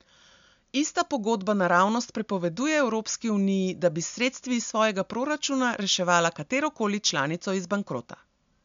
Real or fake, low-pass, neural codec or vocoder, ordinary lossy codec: real; 7.2 kHz; none; MP3, 64 kbps